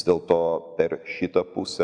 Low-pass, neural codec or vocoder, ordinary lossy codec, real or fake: 9.9 kHz; none; MP3, 96 kbps; real